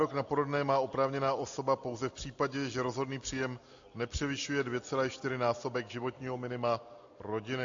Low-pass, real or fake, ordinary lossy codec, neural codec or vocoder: 7.2 kHz; real; AAC, 64 kbps; none